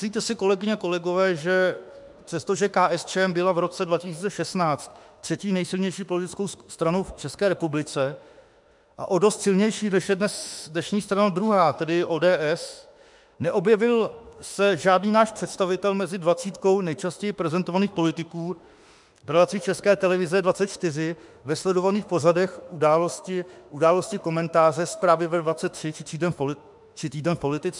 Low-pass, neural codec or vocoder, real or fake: 10.8 kHz; autoencoder, 48 kHz, 32 numbers a frame, DAC-VAE, trained on Japanese speech; fake